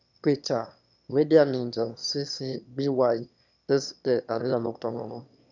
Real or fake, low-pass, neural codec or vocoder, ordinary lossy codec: fake; 7.2 kHz; autoencoder, 22.05 kHz, a latent of 192 numbers a frame, VITS, trained on one speaker; none